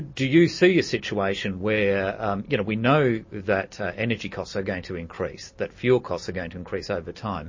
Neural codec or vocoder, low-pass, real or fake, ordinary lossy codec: none; 7.2 kHz; real; MP3, 32 kbps